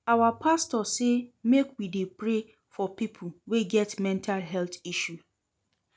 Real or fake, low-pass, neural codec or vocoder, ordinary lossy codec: real; none; none; none